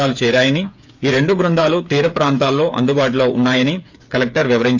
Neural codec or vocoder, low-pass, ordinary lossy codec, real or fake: codec, 16 kHz, 8 kbps, FreqCodec, smaller model; 7.2 kHz; AAC, 48 kbps; fake